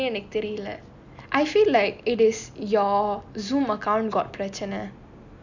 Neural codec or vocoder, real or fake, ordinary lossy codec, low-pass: none; real; none; 7.2 kHz